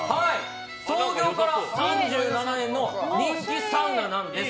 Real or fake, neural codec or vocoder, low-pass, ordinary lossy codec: real; none; none; none